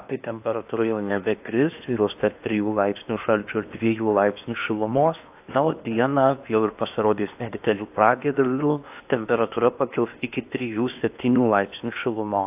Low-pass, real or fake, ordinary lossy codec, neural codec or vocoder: 3.6 kHz; fake; AAC, 32 kbps; codec, 16 kHz in and 24 kHz out, 0.8 kbps, FocalCodec, streaming, 65536 codes